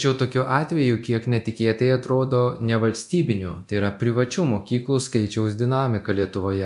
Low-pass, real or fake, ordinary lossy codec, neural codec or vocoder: 10.8 kHz; fake; MP3, 64 kbps; codec, 24 kHz, 0.9 kbps, DualCodec